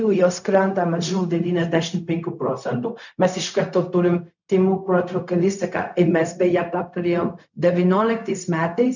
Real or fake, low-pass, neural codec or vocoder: fake; 7.2 kHz; codec, 16 kHz, 0.4 kbps, LongCat-Audio-Codec